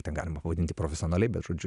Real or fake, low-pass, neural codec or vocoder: real; 10.8 kHz; none